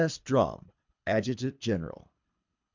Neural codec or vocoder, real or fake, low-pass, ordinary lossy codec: codec, 24 kHz, 3 kbps, HILCodec; fake; 7.2 kHz; MP3, 64 kbps